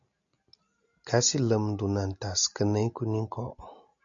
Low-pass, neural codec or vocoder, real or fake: 7.2 kHz; none; real